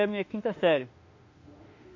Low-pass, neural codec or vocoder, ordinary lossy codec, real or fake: 7.2 kHz; autoencoder, 48 kHz, 32 numbers a frame, DAC-VAE, trained on Japanese speech; MP3, 48 kbps; fake